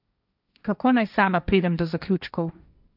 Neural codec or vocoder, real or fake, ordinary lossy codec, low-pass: codec, 16 kHz, 1.1 kbps, Voila-Tokenizer; fake; none; 5.4 kHz